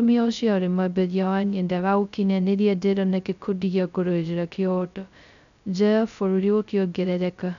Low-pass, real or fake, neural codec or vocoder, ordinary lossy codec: 7.2 kHz; fake; codec, 16 kHz, 0.2 kbps, FocalCodec; none